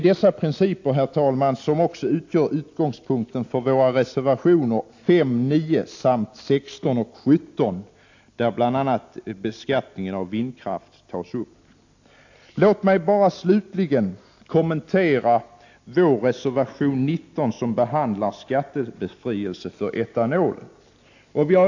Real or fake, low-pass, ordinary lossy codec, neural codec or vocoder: real; 7.2 kHz; AAC, 48 kbps; none